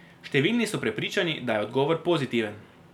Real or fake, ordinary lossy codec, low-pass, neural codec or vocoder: real; none; 19.8 kHz; none